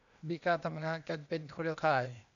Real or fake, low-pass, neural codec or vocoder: fake; 7.2 kHz; codec, 16 kHz, 0.8 kbps, ZipCodec